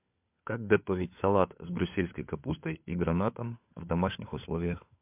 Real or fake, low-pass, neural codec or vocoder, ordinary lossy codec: fake; 3.6 kHz; codec, 16 kHz, 4 kbps, FunCodec, trained on LibriTTS, 50 frames a second; MP3, 32 kbps